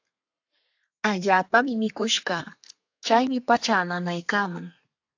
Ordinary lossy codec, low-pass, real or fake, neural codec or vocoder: AAC, 48 kbps; 7.2 kHz; fake; codec, 32 kHz, 1.9 kbps, SNAC